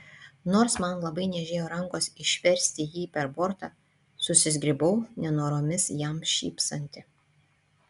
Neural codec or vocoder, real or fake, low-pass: none; real; 10.8 kHz